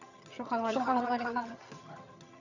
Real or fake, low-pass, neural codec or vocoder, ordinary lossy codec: fake; 7.2 kHz; vocoder, 22.05 kHz, 80 mel bands, HiFi-GAN; none